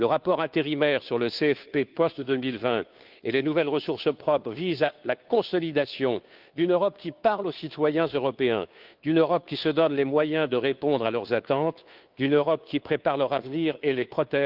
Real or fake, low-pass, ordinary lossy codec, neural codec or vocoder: fake; 5.4 kHz; Opus, 24 kbps; codec, 16 kHz, 2 kbps, FunCodec, trained on Chinese and English, 25 frames a second